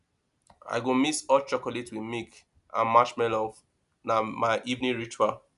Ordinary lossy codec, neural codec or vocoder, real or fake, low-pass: none; none; real; 10.8 kHz